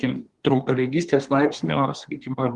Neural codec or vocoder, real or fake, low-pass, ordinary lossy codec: codec, 24 kHz, 1 kbps, SNAC; fake; 10.8 kHz; Opus, 24 kbps